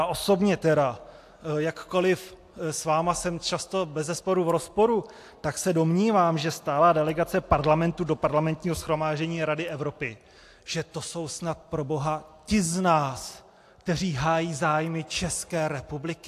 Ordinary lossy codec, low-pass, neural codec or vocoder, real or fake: AAC, 64 kbps; 14.4 kHz; none; real